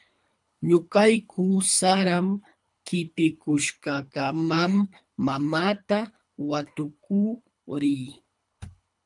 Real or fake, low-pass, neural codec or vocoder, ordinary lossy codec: fake; 10.8 kHz; codec, 24 kHz, 3 kbps, HILCodec; MP3, 96 kbps